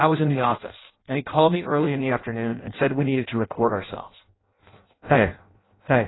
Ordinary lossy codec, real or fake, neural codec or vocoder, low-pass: AAC, 16 kbps; fake; codec, 16 kHz in and 24 kHz out, 0.6 kbps, FireRedTTS-2 codec; 7.2 kHz